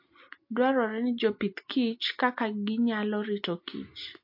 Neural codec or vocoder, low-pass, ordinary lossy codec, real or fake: none; 5.4 kHz; MP3, 32 kbps; real